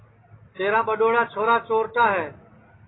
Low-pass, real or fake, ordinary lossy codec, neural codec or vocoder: 7.2 kHz; real; AAC, 16 kbps; none